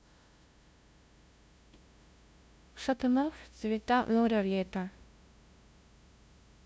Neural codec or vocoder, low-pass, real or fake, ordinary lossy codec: codec, 16 kHz, 0.5 kbps, FunCodec, trained on LibriTTS, 25 frames a second; none; fake; none